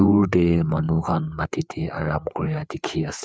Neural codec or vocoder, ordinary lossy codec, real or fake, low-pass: codec, 16 kHz, 4 kbps, FreqCodec, larger model; none; fake; none